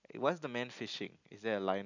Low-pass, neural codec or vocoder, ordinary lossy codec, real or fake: 7.2 kHz; none; none; real